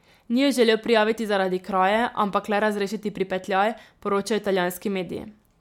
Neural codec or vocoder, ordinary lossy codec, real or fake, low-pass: none; MP3, 96 kbps; real; 19.8 kHz